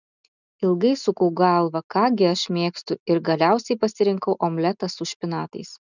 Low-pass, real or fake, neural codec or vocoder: 7.2 kHz; real; none